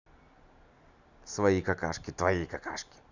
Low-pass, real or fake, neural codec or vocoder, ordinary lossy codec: 7.2 kHz; real; none; none